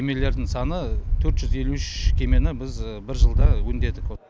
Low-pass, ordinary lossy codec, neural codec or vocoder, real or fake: none; none; none; real